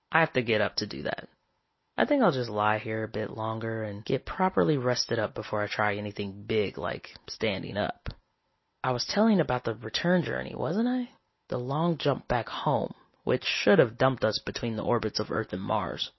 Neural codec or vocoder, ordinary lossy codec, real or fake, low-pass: none; MP3, 24 kbps; real; 7.2 kHz